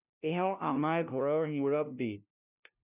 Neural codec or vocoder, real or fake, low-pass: codec, 16 kHz, 0.5 kbps, FunCodec, trained on LibriTTS, 25 frames a second; fake; 3.6 kHz